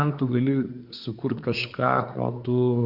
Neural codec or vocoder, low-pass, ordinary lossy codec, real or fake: codec, 24 kHz, 1 kbps, SNAC; 5.4 kHz; MP3, 48 kbps; fake